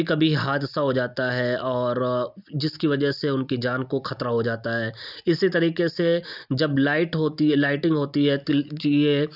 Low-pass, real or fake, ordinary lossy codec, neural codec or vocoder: 5.4 kHz; real; none; none